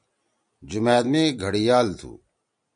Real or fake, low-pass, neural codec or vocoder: real; 9.9 kHz; none